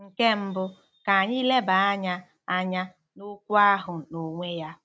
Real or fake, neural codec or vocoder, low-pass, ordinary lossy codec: real; none; none; none